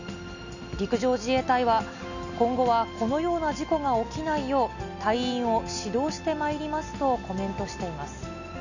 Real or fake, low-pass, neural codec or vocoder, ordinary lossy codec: real; 7.2 kHz; none; none